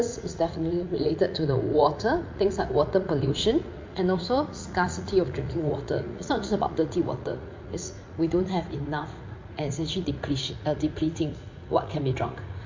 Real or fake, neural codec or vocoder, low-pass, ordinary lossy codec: fake; vocoder, 44.1 kHz, 80 mel bands, Vocos; 7.2 kHz; MP3, 48 kbps